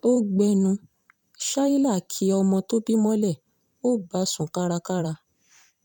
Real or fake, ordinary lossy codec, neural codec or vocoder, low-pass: fake; none; vocoder, 44.1 kHz, 128 mel bands every 512 samples, BigVGAN v2; 19.8 kHz